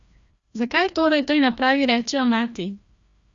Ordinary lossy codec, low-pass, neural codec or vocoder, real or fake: Opus, 64 kbps; 7.2 kHz; codec, 16 kHz, 1 kbps, FreqCodec, larger model; fake